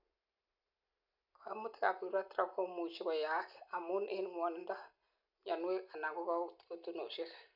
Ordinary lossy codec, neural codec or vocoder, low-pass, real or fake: none; none; 5.4 kHz; real